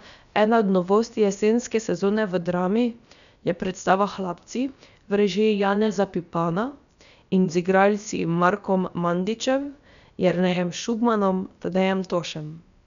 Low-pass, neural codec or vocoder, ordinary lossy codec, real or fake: 7.2 kHz; codec, 16 kHz, about 1 kbps, DyCAST, with the encoder's durations; none; fake